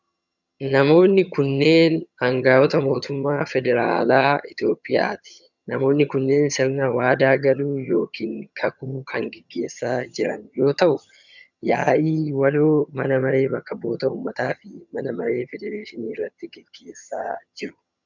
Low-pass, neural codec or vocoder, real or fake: 7.2 kHz; vocoder, 22.05 kHz, 80 mel bands, HiFi-GAN; fake